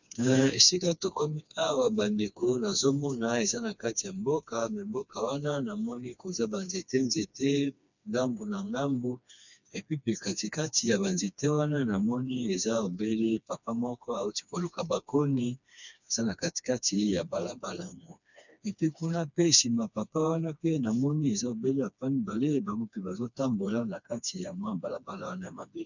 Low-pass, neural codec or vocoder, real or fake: 7.2 kHz; codec, 16 kHz, 2 kbps, FreqCodec, smaller model; fake